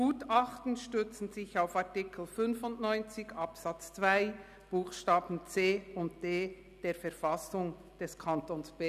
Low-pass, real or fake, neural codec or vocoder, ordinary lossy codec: 14.4 kHz; real; none; none